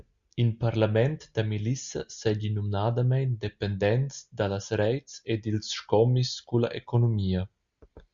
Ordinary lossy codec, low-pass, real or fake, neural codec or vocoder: Opus, 64 kbps; 7.2 kHz; real; none